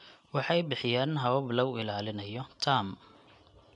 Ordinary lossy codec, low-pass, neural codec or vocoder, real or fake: none; 10.8 kHz; none; real